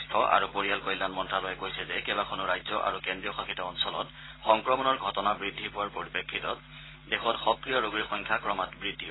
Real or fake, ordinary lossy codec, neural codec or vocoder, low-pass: real; AAC, 16 kbps; none; 7.2 kHz